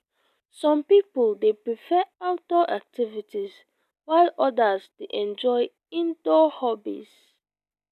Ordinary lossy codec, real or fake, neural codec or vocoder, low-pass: none; real; none; 14.4 kHz